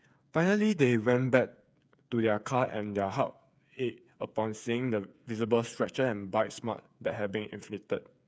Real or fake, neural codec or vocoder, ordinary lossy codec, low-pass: fake; codec, 16 kHz, 8 kbps, FreqCodec, smaller model; none; none